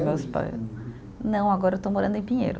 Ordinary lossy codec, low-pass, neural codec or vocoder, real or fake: none; none; none; real